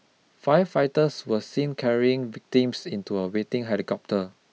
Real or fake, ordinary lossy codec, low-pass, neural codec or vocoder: real; none; none; none